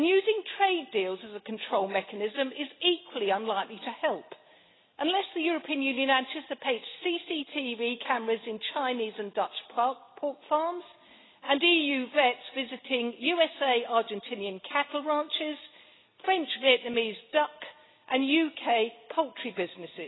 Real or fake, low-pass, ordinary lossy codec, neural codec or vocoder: real; 7.2 kHz; AAC, 16 kbps; none